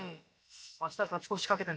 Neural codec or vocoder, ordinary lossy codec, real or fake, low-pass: codec, 16 kHz, about 1 kbps, DyCAST, with the encoder's durations; none; fake; none